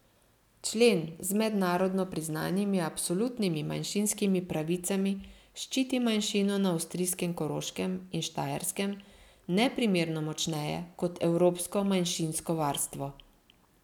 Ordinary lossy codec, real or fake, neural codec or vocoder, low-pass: none; real; none; 19.8 kHz